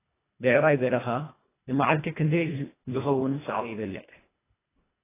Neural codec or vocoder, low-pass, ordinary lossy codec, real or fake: codec, 24 kHz, 1.5 kbps, HILCodec; 3.6 kHz; AAC, 16 kbps; fake